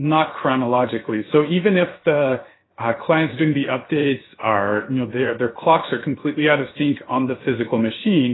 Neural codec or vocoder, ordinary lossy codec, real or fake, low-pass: codec, 16 kHz, 0.7 kbps, FocalCodec; AAC, 16 kbps; fake; 7.2 kHz